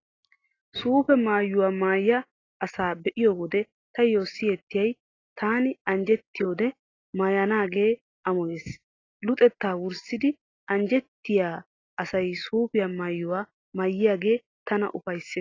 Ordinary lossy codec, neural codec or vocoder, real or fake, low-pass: AAC, 32 kbps; none; real; 7.2 kHz